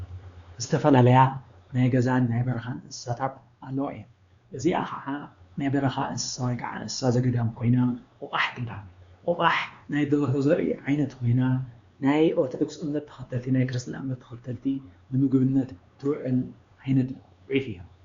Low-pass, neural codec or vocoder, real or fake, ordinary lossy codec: 7.2 kHz; codec, 16 kHz, 2 kbps, X-Codec, WavLM features, trained on Multilingual LibriSpeech; fake; Opus, 64 kbps